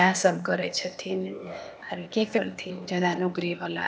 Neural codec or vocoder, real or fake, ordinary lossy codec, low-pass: codec, 16 kHz, 0.8 kbps, ZipCodec; fake; none; none